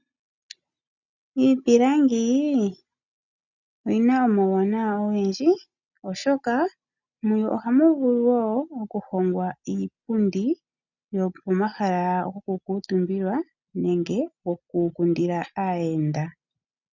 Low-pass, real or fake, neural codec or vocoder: 7.2 kHz; real; none